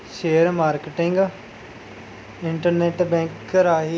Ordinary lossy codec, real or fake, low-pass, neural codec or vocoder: none; real; none; none